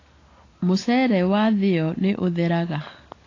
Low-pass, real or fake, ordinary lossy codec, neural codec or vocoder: 7.2 kHz; real; AAC, 32 kbps; none